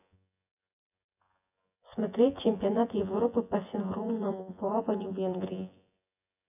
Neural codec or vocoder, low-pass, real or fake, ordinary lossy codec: vocoder, 24 kHz, 100 mel bands, Vocos; 3.6 kHz; fake; none